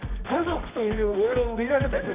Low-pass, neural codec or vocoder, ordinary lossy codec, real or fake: 3.6 kHz; codec, 24 kHz, 0.9 kbps, WavTokenizer, medium music audio release; Opus, 64 kbps; fake